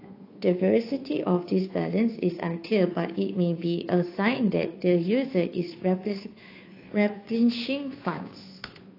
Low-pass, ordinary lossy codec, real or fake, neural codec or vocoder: 5.4 kHz; AAC, 32 kbps; fake; codec, 16 kHz, 2 kbps, FunCodec, trained on Chinese and English, 25 frames a second